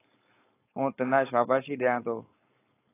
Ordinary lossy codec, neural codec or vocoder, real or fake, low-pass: AAC, 16 kbps; codec, 16 kHz, 4.8 kbps, FACodec; fake; 3.6 kHz